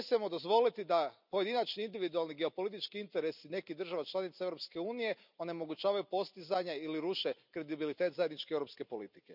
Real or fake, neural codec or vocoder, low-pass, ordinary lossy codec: real; none; 5.4 kHz; none